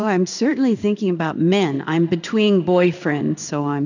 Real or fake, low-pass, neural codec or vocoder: fake; 7.2 kHz; codec, 16 kHz in and 24 kHz out, 1 kbps, XY-Tokenizer